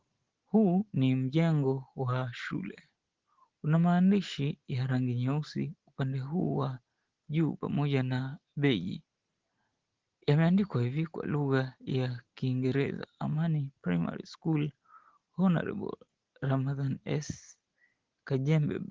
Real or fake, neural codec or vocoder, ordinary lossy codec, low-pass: real; none; Opus, 16 kbps; 7.2 kHz